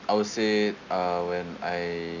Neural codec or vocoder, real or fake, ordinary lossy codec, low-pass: none; real; none; 7.2 kHz